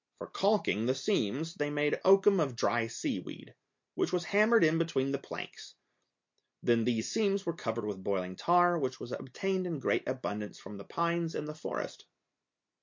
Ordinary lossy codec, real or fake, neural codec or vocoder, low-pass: MP3, 48 kbps; real; none; 7.2 kHz